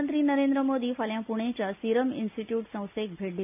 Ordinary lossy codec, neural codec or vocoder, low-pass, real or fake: none; none; 3.6 kHz; real